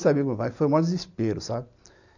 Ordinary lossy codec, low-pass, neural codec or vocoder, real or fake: none; 7.2 kHz; none; real